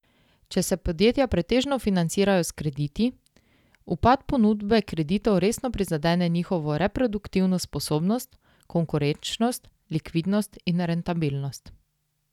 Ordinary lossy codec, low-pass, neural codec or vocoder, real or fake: none; 19.8 kHz; none; real